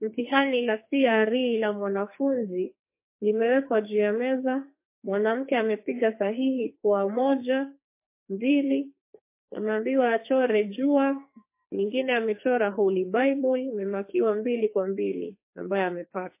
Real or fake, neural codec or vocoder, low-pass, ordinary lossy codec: fake; codec, 44.1 kHz, 2.6 kbps, SNAC; 3.6 kHz; MP3, 24 kbps